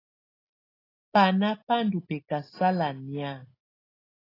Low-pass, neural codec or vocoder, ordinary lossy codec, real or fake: 5.4 kHz; none; AAC, 24 kbps; real